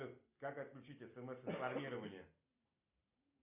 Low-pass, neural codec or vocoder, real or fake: 3.6 kHz; none; real